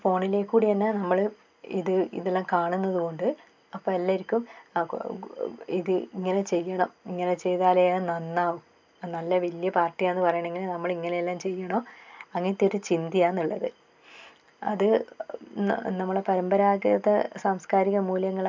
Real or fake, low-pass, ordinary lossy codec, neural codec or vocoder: real; 7.2 kHz; MP3, 64 kbps; none